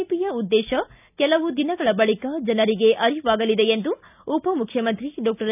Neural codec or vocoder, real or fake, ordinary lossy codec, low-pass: none; real; none; 3.6 kHz